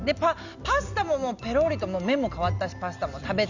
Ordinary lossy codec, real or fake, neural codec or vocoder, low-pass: Opus, 64 kbps; real; none; 7.2 kHz